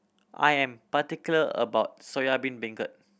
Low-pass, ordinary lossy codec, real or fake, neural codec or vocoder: none; none; real; none